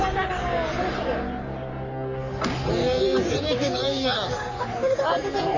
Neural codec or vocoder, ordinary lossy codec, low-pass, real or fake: codec, 44.1 kHz, 3.4 kbps, Pupu-Codec; none; 7.2 kHz; fake